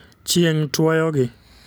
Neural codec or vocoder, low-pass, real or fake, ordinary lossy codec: none; none; real; none